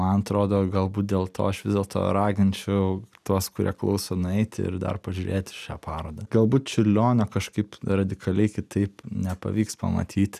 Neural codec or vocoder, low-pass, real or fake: none; 14.4 kHz; real